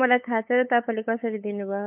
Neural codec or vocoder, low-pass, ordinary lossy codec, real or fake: codec, 16 kHz, 8 kbps, FunCodec, trained on LibriTTS, 25 frames a second; 3.6 kHz; MP3, 32 kbps; fake